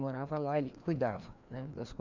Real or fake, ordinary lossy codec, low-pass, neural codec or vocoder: fake; none; 7.2 kHz; codec, 16 kHz, 2 kbps, FreqCodec, larger model